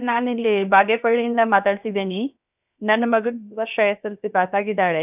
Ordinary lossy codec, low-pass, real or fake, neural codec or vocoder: none; 3.6 kHz; fake; codec, 16 kHz, 0.7 kbps, FocalCodec